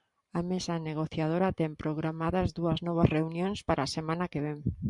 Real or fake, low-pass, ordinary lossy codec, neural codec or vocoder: fake; 10.8 kHz; Opus, 64 kbps; vocoder, 44.1 kHz, 128 mel bands every 512 samples, BigVGAN v2